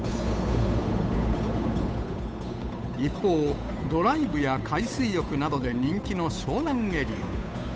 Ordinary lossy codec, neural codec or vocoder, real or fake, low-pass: none; codec, 16 kHz, 8 kbps, FunCodec, trained on Chinese and English, 25 frames a second; fake; none